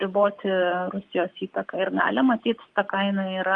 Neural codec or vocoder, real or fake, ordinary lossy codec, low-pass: codec, 44.1 kHz, 7.8 kbps, Pupu-Codec; fake; Opus, 24 kbps; 10.8 kHz